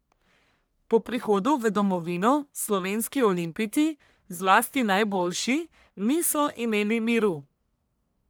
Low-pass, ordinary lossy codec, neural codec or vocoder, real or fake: none; none; codec, 44.1 kHz, 1.7 kbps, Pupu-Codec; fake